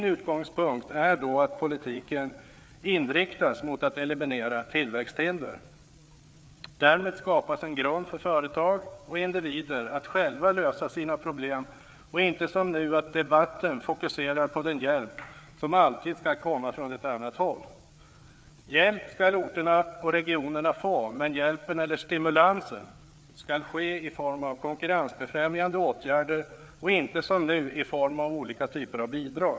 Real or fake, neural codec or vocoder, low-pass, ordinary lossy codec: fake; codec, 16 kHz, 4 kbps, FreqCodec, larger model; none; none